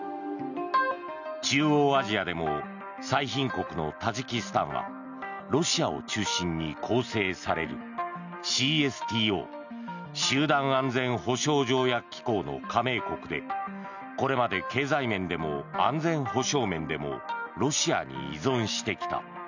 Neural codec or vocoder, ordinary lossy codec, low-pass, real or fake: none; none; 7.2 kHz; real